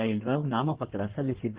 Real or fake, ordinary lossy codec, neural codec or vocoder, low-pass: fake; Opus, 16 kbps; codec, 16 kHz in and 24 kHz out, 1.1 kbps, FireRedTTS-2 codec; 3.6 kHz